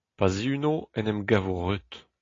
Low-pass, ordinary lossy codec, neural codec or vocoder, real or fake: 7.2 kHz; AAC, 32 kbps; none; real